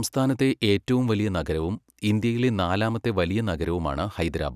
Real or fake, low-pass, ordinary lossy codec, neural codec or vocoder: real; 14.4 kHz; none; none